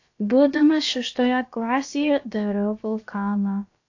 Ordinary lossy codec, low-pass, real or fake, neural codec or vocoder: AAC, 48 kbps; 7.2 kHz; fake; codec, 16 kHz, about 1 kbps, DyCAST, with the encoder's durations